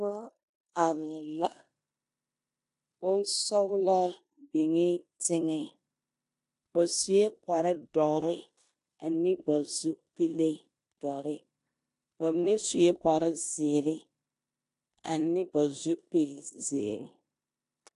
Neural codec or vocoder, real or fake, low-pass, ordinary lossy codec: codec, 16 kHz in and 24 kHz out, 0.9 kbps, LongCat-Audio-Codec, four codebook decoder; fake; 10.8 kHz; AAC, 64 kbps